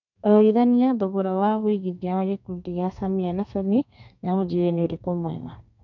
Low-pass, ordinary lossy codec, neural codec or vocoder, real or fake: 7.2 kHz; none; codec, 32 kHz, 1.9 kbps, SNAC; fake